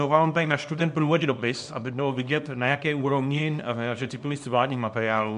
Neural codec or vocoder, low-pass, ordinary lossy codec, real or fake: codec, 24 kHz, 0.9 kbps, WavTokenizer, small release; 10.8 kHz; MP3, 64 kbps; fake